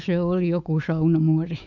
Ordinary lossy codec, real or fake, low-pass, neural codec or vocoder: none; fake; 7.2 kHz; autoencoder, 48 kHz, 128 numbers a frame, DAC-VAE, trained on Japanese speech